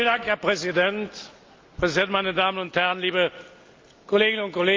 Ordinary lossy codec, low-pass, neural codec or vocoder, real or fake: Opus, 32 kbps; 7.2 kHz; none; real